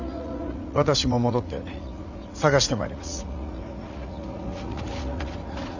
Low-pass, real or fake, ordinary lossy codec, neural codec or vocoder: 7.2 kHz; fake; none; vocoder, 44.1 kHz, 80 mel bands, Vocos